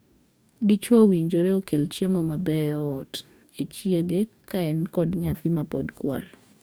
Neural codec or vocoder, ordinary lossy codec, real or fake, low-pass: codec, 44.1 kHz, 2.6 kbps, DAC; none; fake; none